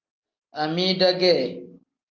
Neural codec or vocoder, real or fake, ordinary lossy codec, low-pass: none; real; Opus, 32 kbps; 7.2 kHz